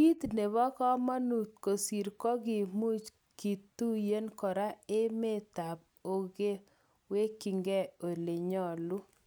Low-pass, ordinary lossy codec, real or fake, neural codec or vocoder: none; none; real; none